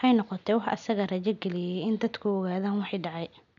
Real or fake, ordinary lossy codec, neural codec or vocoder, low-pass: real; none; none; 7.2 kHz